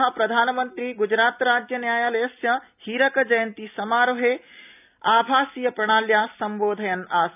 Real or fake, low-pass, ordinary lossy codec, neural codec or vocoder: real; 3.6 kHz; none; none